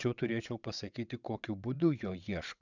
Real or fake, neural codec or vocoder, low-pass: fake; vocoder, 22.05 kHz, 80 mel bands, WaveNeXt; 7.2 kHz